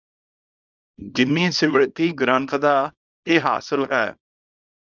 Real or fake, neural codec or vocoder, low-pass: fake; codec, 24 kHz, 0.9 kbps, WavTokenizer, small release; 7.2 kHz